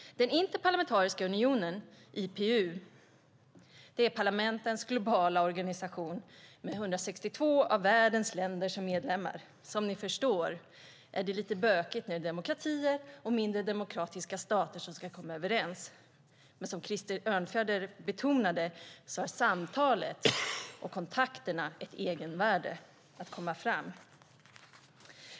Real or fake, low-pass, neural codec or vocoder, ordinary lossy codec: real; none; none; none